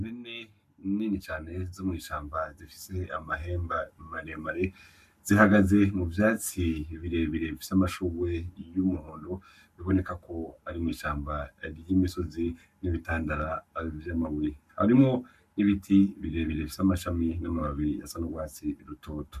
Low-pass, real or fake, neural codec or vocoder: 14.4 kHz; fake; codec, 44.1 kHz, 7.8 kbps, Pupu-Codec